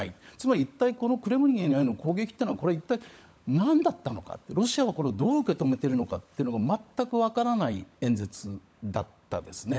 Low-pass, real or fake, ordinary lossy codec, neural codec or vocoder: none; fake; none; codec, 16 kHz, 16 kbps, FunCodec, trained on LibriTTS, 50 frames a second